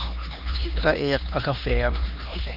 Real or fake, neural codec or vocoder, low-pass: fake; codec, 16 kHz, 2 kbps, X-Codec, HuBERT features, trained on LibriSpeech; 5.4 kHz